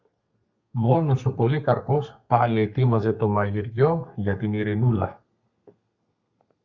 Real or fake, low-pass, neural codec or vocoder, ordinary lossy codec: fake; 7.2 kHz; codec, 32 kHz, 1.9 kbps, SNAC; Opus, 64 kbps